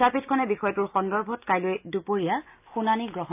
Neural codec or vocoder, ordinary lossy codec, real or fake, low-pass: none; AAC, 32 kbps; real; 3.6 kHz